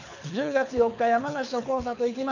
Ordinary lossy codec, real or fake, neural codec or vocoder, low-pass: none; fake; codec, 24 kHz, 6 kbps, HILCodec; 7.2 kHz